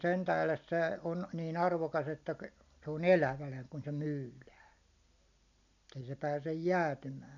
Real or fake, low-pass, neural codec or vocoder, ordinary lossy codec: real; 7.2 kHz; none; none